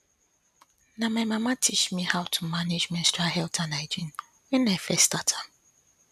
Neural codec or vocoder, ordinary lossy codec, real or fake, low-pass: none; none; real; 14.4 kHz